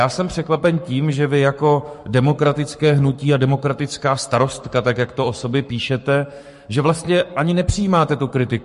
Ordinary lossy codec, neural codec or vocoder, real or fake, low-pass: MP3, 48 kbps; codec, 44.1 kHz, 7.8 kbps, Pupu-Codec; fake; 14.4 kHz